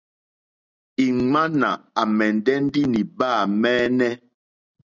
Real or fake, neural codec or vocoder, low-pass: real; none; 7.2 kHz